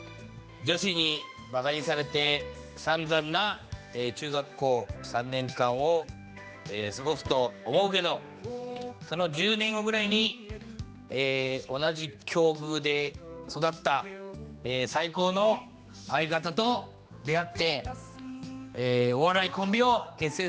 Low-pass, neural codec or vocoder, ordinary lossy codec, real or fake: none; codec, 16 kHz, 2 kbps, X-Codec, HuBERT features, trained on general audio; none; fake